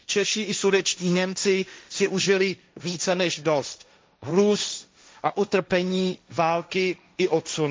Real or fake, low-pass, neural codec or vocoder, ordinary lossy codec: fake; none; codec, 16 kHz, 1.1 kbps, Voila-Tokenizer; none